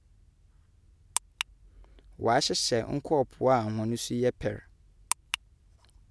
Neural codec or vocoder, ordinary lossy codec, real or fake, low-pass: none; none; real; none